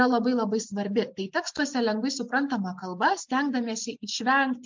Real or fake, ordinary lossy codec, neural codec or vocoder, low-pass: real; MP3, 64 kbps; none; 7.2 kHz